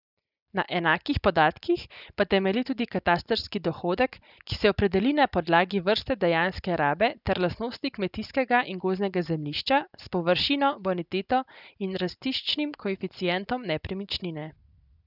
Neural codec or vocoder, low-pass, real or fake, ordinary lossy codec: none; 5.4 kHz; real; none